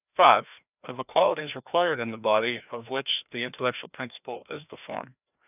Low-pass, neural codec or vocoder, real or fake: 3.6 kHz; codec, 16 kHz, 1 kbps, FreqCodec, larger model; fake